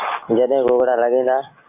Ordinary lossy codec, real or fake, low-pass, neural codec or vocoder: MP3, 24 kbps; real; 3.6 kHz; none